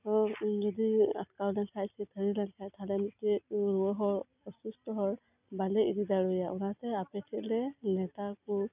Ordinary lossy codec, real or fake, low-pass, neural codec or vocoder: none; real; 3.6 kHz; none